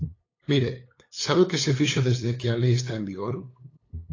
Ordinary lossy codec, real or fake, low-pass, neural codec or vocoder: AAC, 32 kbps; fake; 7.2 kHz; codec, 16 kHz, 8 kbps, FunCodec, trained on LibriTTS, 25 frames a second